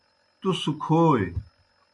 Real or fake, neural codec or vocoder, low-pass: real; none; 10.8 kHz